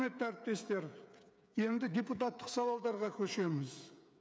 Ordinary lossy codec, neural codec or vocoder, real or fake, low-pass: none; codec, 16 kHz, 8 kbps, FreqCodec, smaller model; fake; none